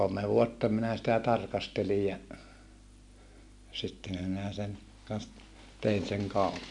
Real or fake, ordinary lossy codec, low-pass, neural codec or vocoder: real; MP3, 64 kbps; 10.8 kHz; none